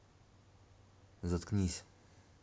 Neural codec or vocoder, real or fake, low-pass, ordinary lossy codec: none; real; none; none